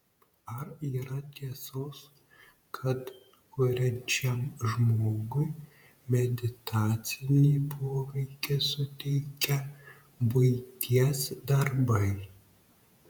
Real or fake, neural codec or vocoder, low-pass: fake; vocoder, 48 kHz, 128 mel bands, Vocos; 19.8 kHz